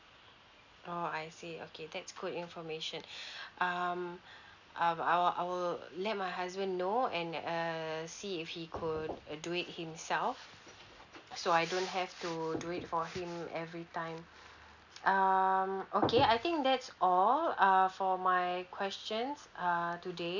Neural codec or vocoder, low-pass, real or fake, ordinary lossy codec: none; 7.2 kHz; real; none